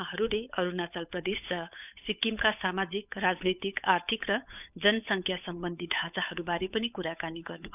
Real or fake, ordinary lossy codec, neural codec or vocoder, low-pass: fake; none; codec, 16 kHz, 8 kbps, FunCodec, trained on Chinese and English, 25 frames a second; 3.6 kHz